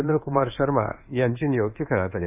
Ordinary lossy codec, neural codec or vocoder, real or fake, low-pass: none; codec, 24 kHz, 1.2 kbps, DualCodec; fake; 3.6 kHz